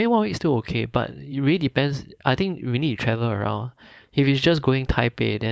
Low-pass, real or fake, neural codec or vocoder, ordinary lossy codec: none; fake; codec, 16 kHz, 4.8 kbps, FACodec; none